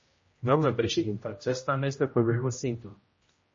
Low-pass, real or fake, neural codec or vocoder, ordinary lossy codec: 7.2 kHz; fake; codec, 16 kHz, 0.5 kbps, X-Codec, HuBERT features, trained on general audio; MP3, 32 kbps